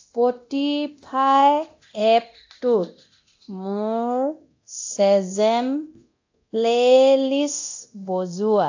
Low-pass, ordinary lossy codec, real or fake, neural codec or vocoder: 7.2 kHz; AAC, 48 kbps; fake; codec, 24 kHz, 0.9 kbps, DualCodec